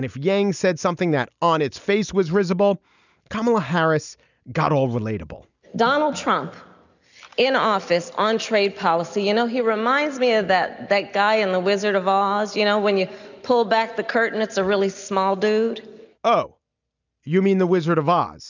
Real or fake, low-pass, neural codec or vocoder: real; 7.2 kHz; none